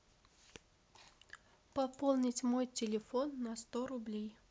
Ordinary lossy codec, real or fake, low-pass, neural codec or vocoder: none; real; none; none